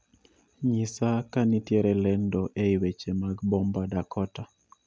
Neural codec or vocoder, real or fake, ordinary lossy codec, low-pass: none; real; none; none